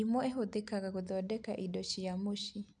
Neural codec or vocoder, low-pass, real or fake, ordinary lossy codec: none; 9.9 kHz; real; none